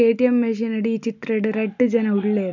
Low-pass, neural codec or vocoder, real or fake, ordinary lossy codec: 7.2 kHz; none; real; none